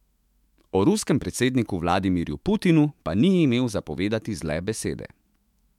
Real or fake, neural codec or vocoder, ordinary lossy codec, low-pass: fake; autoencoder, 48 kHz, 128 numbers a frame, DAC-VAE, trained on Japanese speech; MP3, 96 kbps; 19.8 kHz